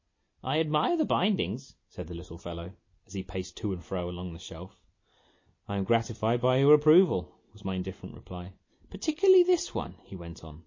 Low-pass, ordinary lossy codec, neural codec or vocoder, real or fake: 7.2 kHz; MP3, 32 kbps; none; real